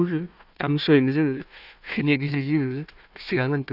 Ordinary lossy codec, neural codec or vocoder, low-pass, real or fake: none; codec, 16 kHz, 1 kbps, FunCodec, trained on Chinese and English, 50 frames a second; 5.4 kHz; fake